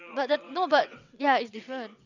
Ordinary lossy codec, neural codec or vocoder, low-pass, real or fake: none; vocoder, 22.05 kHz, 80 mel bands, WaveNeXt; 7.2 kHz; fake